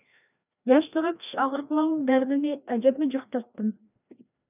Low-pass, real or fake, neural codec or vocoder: 3.6 kHz; fake; codec, 16 kHz, 1 kbps, FreqCodec, larger model